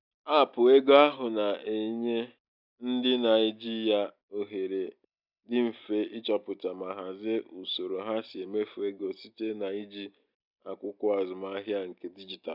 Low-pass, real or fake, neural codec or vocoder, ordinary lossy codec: 5.4 kHz; real; none; none